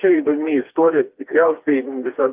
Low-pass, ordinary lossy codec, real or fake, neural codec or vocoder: 3.6 kHz; Opus, 32 kbps; fake; codec, 16 kHz, 2 kbps, FreqCodec, smaller model